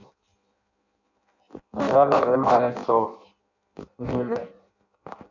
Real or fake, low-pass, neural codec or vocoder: fake; 7.2 kHz; codec, 16 kHz in and 24 kHz out, 0.6 kbps, FireRedTTS-2 codec